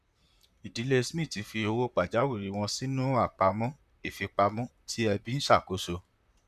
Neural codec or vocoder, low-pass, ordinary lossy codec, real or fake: vocoder, 44.1 kHz, 128 mel bands, Pupu-Vocoder; 14.4 kHz; none; fake